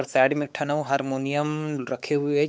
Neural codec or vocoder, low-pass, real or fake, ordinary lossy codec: codec, 16 kHz, 4 kbps, X-Codec, WavLM features, trained on Multilingual LibriSpeech; none; fake; none